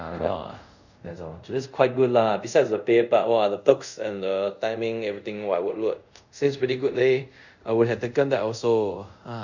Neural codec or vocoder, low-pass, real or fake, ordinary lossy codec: codec, 24 kHz, 0.5 kbps, DualCodec; 7.2 kHz; fake; none